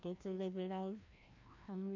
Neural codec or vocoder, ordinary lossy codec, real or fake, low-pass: codec, 16 kHz, 1 kbps, FreqCodec, larger model; MP3, 48 kbps; fake; 7.2 kHz